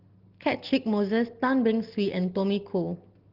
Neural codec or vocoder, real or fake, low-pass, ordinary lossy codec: none; real; 5.4 kHz; Opus, 16 kbps